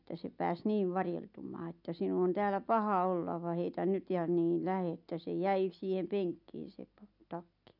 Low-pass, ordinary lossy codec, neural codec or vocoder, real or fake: 5.4 kHz; none; none; real